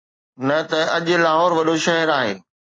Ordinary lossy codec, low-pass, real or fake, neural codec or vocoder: AAC, 32 kbps; 9.9 kHz; real; none